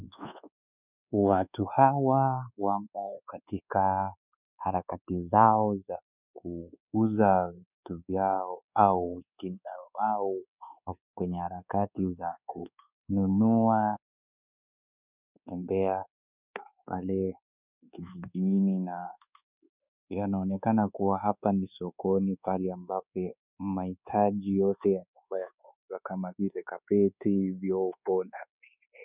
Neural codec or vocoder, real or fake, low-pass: codec, 24 kHz, 1.2 kbps, DualCodec; fake; 3.6 kHz